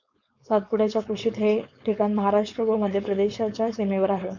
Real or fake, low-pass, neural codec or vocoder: fake; 7.2 kHz; codec, 16 kHz, 4.8 kbps, FACodec